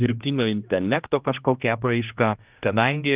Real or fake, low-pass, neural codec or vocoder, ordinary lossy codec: fake; 3.6 kHz; codec, 16 kHz, 1 kbps, X-Codec, HuBERT features, trained on general audio; Opus, 32 kbps